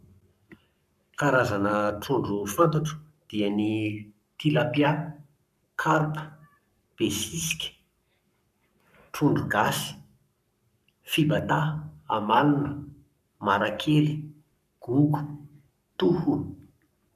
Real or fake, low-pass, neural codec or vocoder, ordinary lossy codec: fake; 14.4 kHz; codec, 44.1 kHz, 7.8 kbps, Pupu-Codec; none